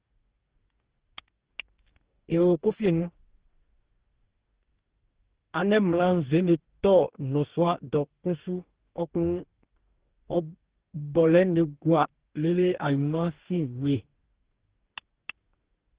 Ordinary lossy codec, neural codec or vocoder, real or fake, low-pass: Opus, 16 kbps; codec, 44.1 kHz, 2.6 kbps, DAC; fake; 3.6 kHz